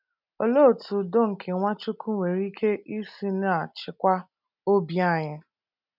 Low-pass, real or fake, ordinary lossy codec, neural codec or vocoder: 5.4 kHz; real; none; none